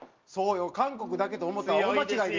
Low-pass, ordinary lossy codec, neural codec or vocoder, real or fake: 7.2 kHz; Opus, 24 kbps; none; real